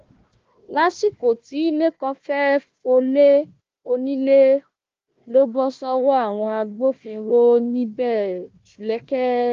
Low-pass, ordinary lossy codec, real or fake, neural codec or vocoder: 7.2 kHz; Opus, 32 kbps; fake; codec, 16 kHz, 1 kbps, FunCodec, trained on Chinese and English, 50 frames a second